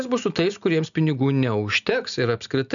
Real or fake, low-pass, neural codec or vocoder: real; 7.2 kHz; none